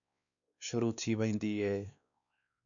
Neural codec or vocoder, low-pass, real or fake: codec, 16 kHz, 2 kbps, X-Codec, WavLM features, trained on Multilingual LibriSpeech; 7.2 kHz; fake